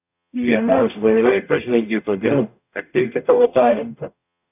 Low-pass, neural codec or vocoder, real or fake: 3.6 kHz; codec, 44.1 kHz, 0.9 kbps, DAC; fake